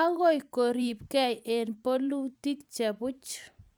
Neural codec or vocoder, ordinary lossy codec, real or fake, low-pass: vocoder, 44.1 kHz, 128 mel bands every 512 samples, BigVGAN v2; none; fake; none